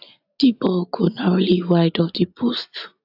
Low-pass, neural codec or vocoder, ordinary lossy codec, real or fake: 5.4 kHz; none; AAC, 48 kbps; real